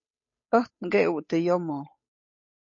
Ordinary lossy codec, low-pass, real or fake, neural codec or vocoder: MP3, 32 kbps; 7.2 kHz; fake; codec, 16 kHz, 8 kbps, FunCodec, trained on Chinese and English, 25 frames a second